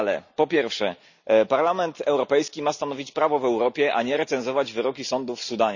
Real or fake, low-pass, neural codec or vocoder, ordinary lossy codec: real; 7.2 kHz; none; none